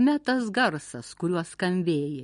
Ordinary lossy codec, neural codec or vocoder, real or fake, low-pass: MP3, 48 kbps; none; real; 10.8 kHz